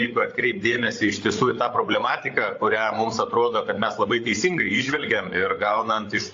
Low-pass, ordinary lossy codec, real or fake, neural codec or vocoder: 7.2 kHz; AAC, 48 kbps; fake; codec, 16 kHz, 8 kbps, FreqCodec, larger model